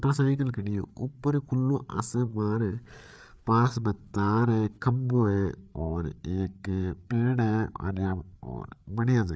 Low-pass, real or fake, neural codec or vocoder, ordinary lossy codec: none; fake; codec, 16 kHz, 8 kbps, FreqCodec, larger model; none